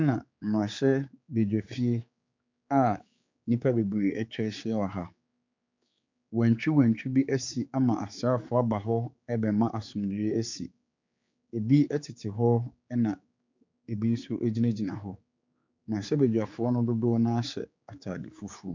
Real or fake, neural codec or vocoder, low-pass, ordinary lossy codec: fake; codec, 16 kHz, 4 kbps, X-Codec, HuBERT features, trained on general audio; 7.2 kHz; MP3, 64 kbps